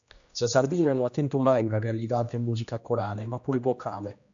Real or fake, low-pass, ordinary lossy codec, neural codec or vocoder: fake; 7.2 kHz; AAC, 64 kbps; codec, 16 kHz, 1 kbps, X-Codec, HuBERT features, trained on general audio